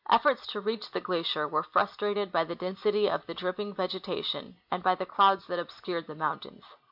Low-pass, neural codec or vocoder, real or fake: 5.4 kHz; none; real